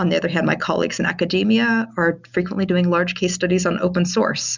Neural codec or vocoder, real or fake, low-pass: none; real; 7.2 kHz